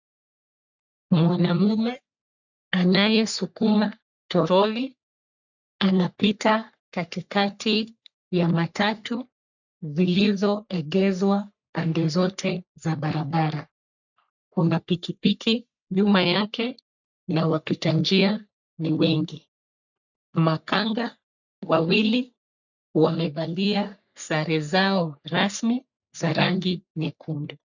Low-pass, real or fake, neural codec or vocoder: 7.2 kHz; fake; codec, 44.1 kHz, 3.4 kbps, Pupu-Codec